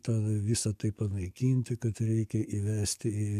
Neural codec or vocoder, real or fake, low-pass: codec, 44.1 kHz, 7.8 kbps, DAC; fake; 14.4 kHz